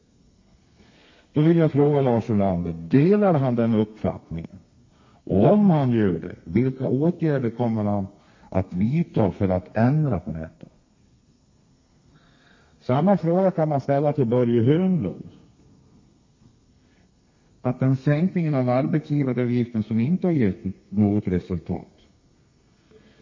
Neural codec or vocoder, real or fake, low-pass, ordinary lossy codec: codec, 32 kHz, 1.9 kbps, SNAC; fake; 7.2 kHz; MP3, 32 kbps